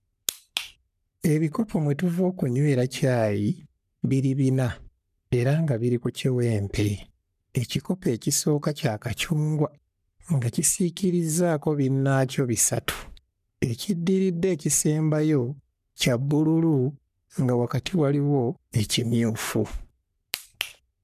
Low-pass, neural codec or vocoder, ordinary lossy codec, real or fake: 14.4 kHz; codec, 44.1 kHz, 3.4 kbps, Pupu-Codec; none; fake